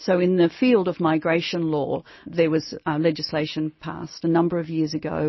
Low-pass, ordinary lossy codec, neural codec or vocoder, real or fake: 7.2 kHz; MP3, 24 kbps; none; real